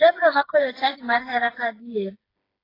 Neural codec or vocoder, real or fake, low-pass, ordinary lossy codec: codec, 16 kHz, 4 kbps, FreqCodec, smaller model; fake; 5.4 kHz; AAC, 24 kbps